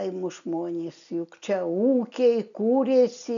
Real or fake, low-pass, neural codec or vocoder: real; 7.2 kHz; none